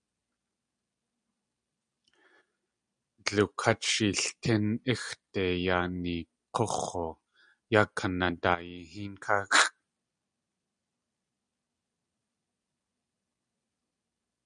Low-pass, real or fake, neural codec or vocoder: 9.9 kHz; real; none